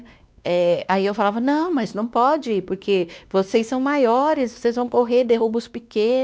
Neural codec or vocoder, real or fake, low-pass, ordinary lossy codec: codec, 16 kHz, 2 kbps, X-Codec, WavLM features, trained on Multilingual LibriSpeech; fake; none; none